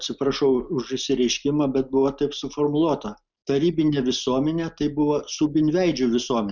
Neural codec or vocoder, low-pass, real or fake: none; 7.2 kHz; real